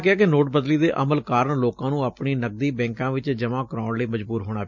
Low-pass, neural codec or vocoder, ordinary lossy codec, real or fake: 7.2 kHz; none; none; real